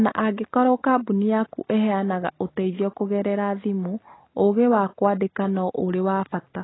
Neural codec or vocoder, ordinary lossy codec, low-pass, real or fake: none; AAC, 16 kbps; 7.2 kHz; real